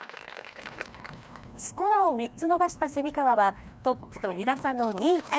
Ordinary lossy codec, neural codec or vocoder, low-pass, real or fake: none; codec, 16 kHz, 1 kbps, FreqCodec, larger model; none; fake